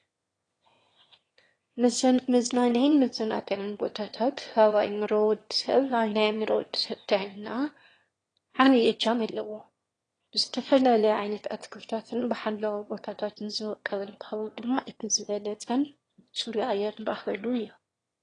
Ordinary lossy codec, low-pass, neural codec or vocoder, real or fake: AAC, 32 kbps; 9.9 kHz; autoencoder, 22.05 kHz, a latent of 192 numbers a frame, VITS, trained on one speaker; fake